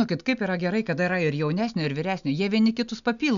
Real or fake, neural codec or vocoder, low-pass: real; none; 7.2 kHz